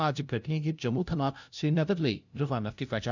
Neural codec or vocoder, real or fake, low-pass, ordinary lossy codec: codec, 16 kHz, 0.5 kbps, FunCodec, trained on LibriTTS, 25 frames a second; fake; 7.2 kHz; none